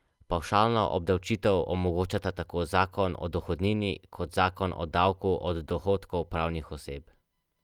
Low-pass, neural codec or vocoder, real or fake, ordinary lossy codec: 19.8 kHz; none; real; Opus, 32 kbps